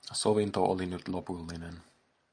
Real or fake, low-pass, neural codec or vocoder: real; 9.9 kHz; none